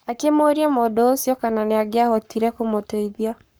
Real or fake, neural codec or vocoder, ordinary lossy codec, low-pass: fake; codec, 44.1 kHz, 7.8 kbps, Pupu-Codec; none; none